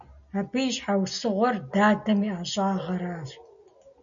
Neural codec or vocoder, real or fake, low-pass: none; real; 7.2 kHz